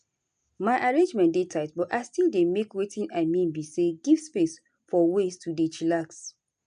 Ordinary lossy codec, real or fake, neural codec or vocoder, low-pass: none; real; none; 9.9 kHz